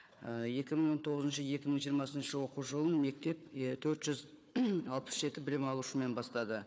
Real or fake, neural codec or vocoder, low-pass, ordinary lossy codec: fake; codec, 16 kHz, 4 kbps, FunCodec, trained on Chinese and English, 50 frames a second; none; none